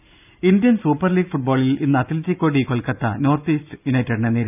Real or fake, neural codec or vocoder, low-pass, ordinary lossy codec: real; none; 3.6 kHz; none